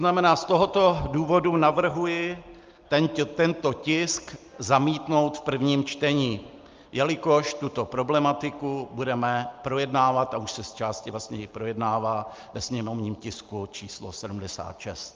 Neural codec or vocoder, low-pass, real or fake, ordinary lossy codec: none; 7.2 kHz; real; Opus, 32 kbps